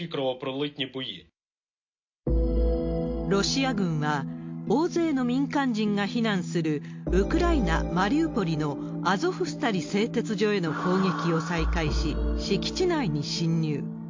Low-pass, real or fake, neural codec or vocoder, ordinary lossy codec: 7.2 kHz; real; none; MP3, 48 kbps